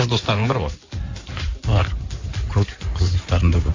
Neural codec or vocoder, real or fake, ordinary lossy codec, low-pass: codec, 16 kHz in and 24 kHz out, 2.2 kbps, FireRedTTS-2 codec; fake; AAC, 48 kbps; 7.2 kHz